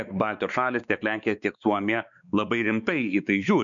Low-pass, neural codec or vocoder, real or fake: 7.2 kHz; codec, 16 kHz, 4 kbps, X-Codec, WavLM features, trained on Multilingual LibriSpeech; fake